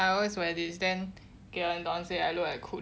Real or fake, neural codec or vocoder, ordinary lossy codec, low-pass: real; none; none; none